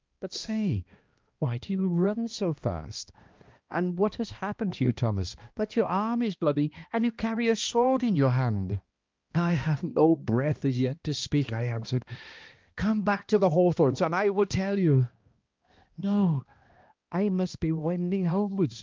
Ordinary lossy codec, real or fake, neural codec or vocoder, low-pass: Opus, 24 kbps; fake; codec, 16 kHz, 1 kbps, X-Codec, HuBERT features, trained on balanced general audio; 7.2 kHz